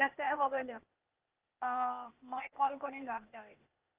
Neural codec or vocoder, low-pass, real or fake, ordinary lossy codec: codec, 16 kHz, 0.8 kbps, ZipCodec; 3.6 kHz; fake; Opus, 32 kbps